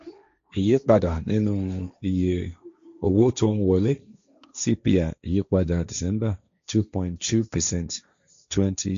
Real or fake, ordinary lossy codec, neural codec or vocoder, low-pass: fake; MP3, 64 kbps; codec, 16 kHz, 1.1 kbps, Voila-Tokenizer; 7.2 kHz